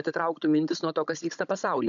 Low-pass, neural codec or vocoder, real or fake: 7.2 kHz; codec, 16 kHz, 16 kbps, FunCodec, trained on Chinese and English, 50 frames a second; fake